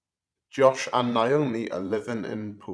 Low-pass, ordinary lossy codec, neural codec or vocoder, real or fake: none; none; vocoder, 22.05 kHz, 80 mel bands, Vocos; fake